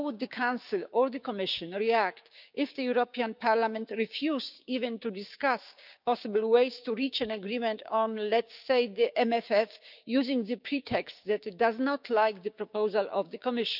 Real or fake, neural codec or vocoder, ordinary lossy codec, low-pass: fake; codec, 16 kHz, 6 kbps, DAC; none; 5.4 kHz